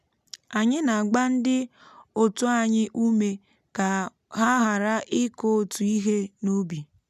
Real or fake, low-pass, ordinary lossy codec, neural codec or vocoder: real; 14.4 kHz; none; none